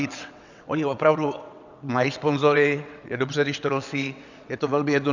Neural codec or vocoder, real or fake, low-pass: vocoder, 22.05 kHz, 80 mel bands, WaveNeXt; fake; 7.2 kHz